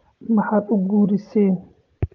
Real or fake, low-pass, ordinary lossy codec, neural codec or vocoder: real; 7.2 kHz; Opus, 24 kbps; none